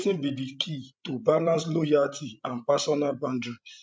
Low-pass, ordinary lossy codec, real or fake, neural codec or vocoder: none; none; fake; codec, 16 kHz, 16 kbps, FreqCodec, larger model